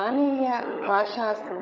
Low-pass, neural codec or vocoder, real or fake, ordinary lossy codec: none; codec, 16 kHz, 16 kbps, FunCodec, trained on LibriTTS, 50 frames a second; fake; none